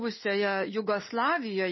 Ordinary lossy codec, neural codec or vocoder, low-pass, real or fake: MP3, 24 kbps; none; 7.2 kHz; real